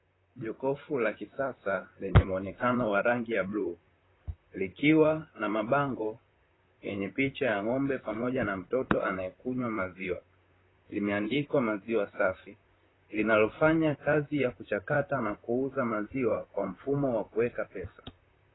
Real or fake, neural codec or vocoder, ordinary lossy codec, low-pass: fake; vocoder, 44.1 kHz, 128 mel bands, Pupu-Vocoder; AAC, 16 kbps; 7.2 kHz